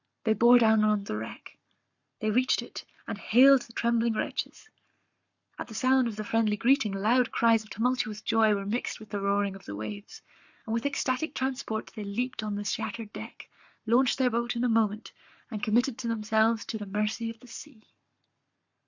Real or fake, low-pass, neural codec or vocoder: fake; 7.2 kHz; codec, 44.1 kHz, 7.8 kbps, Pupu-Codec